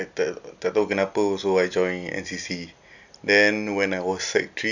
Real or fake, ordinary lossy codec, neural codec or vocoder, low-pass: real; none; none; 7.2 kHz